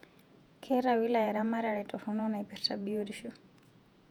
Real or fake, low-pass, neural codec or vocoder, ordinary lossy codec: fake; 19.8 kHz; vocoder, 48 kHz, 128 mel bands, Vocos; none